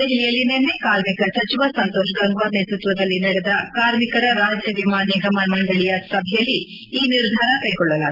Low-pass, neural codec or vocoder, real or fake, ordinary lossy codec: 5.4 kHz; none; real; Opus, 32 kbps